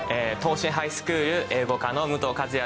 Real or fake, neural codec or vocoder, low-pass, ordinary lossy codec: real; none; none; none